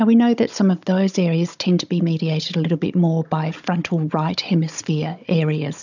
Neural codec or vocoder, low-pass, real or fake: codec, 16 kHz, 16 kbps, FunCodec, trained on Chinese and English, 50 frames a second; 7.2 kHz; fake